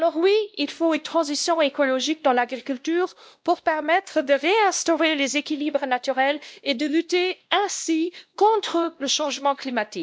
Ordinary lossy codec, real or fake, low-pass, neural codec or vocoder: none; fake; none; codec, 16 kHz, 1 kbps, X-Codec, WavLM features, trained on Multilingual LibriSpeech